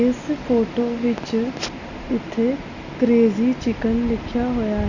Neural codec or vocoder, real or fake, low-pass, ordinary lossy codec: none; real; 7.2 kHz; none